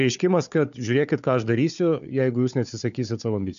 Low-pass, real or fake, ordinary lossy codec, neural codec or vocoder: 7.2 kHz; fake; AAC, 96 kbps; codec, 16 kHz, 4 kbps, FunCodec, trained on Chinese and English, 50 frames a second